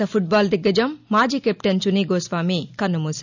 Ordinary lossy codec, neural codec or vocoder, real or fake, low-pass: none; none; real; 7.2 kHz